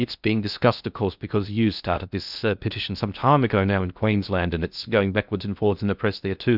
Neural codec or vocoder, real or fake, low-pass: codec, 16 kHz in and 24 kHz out, 0.6 kbps, FocalCodec, streaming, 2048 codes; fake; 5.4 kHz